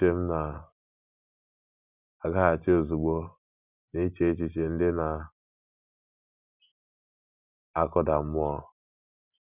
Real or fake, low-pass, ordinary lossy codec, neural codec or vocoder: real; 3.6 kHz; none; none